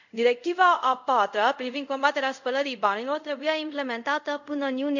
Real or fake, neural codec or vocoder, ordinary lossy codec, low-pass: fake; codec, 24 kHz, 0.5 kbps, DualCodec; none; 7.2 kHz